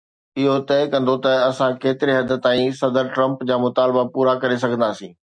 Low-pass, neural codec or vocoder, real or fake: 9.9 kHz; none; real